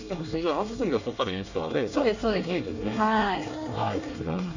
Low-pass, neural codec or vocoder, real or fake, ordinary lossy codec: 7.2 kHz; codec, 24 kHz, 1 kbps, SNAC; fake; none